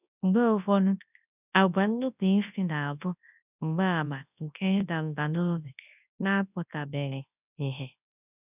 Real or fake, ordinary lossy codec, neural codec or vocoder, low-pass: fake; none; codec, 24 kHz, 0.9 kbps, WavTokenizer, large speech release; 3.6 kHz